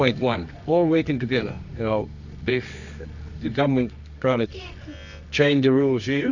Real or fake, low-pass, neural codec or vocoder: fake; 7.2 kHz; codec, 24 kHz, 0.9 kbps, WavTokenizer, medium music audio release